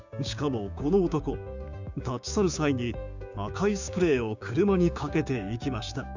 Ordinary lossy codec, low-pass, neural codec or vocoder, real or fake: none; 7.2 kHz; codec, 16 kHz, 6 kbps, DAC; fake